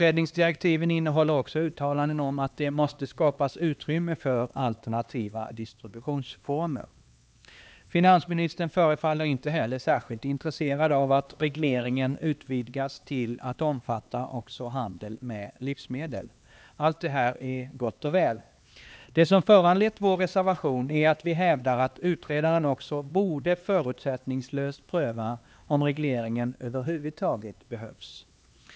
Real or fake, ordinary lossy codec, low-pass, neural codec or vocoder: fake; none; none; codec, 16 kHz, 2 kbps, X-Codec, HuBERT features, trained on LibriSpeech